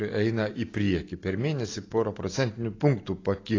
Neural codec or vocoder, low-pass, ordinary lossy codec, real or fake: none; 7.2 kHz; AAC, 32 kbps; real